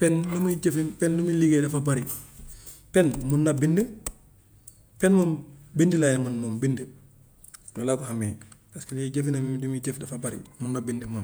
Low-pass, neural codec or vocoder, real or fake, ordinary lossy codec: none; vocoder, 48 kHz, 128 mel bands, Vocos; fake; none